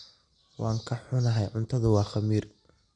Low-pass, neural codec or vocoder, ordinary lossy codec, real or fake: 9.9 kHz; none; none; real